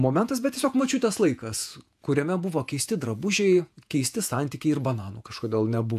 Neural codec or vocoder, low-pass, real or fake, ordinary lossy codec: vocoder, 48 kHz, 128 mel bands, Vocos; 14.4 kHz; fake; AAC, 96 kbps